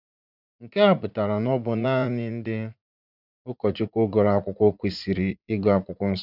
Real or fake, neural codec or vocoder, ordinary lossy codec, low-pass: fake; vocoder, 44.1 kHz, 80 mel bands, Vocos; none; 5.4 kHz